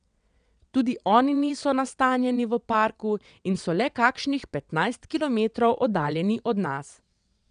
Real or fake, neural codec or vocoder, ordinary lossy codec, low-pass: fake; vocoder, 22.05 kHz, 80 mel bands, WaveNeXt; none; 9.9 kHz